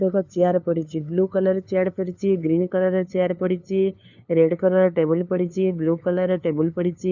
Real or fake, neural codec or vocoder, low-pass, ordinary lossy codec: fake; codec, 16 kHz, 2 kbps, FunCodec, trained on LibriTTS, 25 frames a second; 7.2 kHz; none